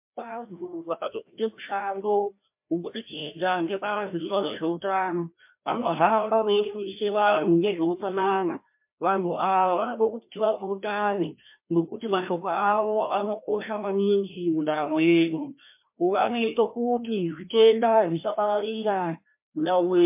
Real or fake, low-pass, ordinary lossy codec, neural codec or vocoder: fake; 3.6 kHz; MP3, 32 kbps; codec, 16 kHz, 1 kbps, FreqCodec, larger model